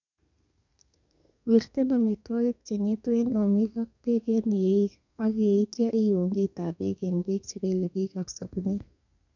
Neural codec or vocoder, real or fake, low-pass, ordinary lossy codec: codec, 32 kHz, 1.9 kbps, SNAC; fake; 7.2 kHz; none